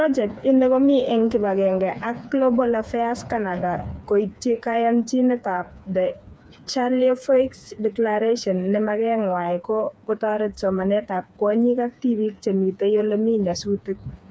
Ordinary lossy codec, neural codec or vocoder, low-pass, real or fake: none; codec, 16 kHz, 4 kbps, FreqCodec, smaller model; none; fake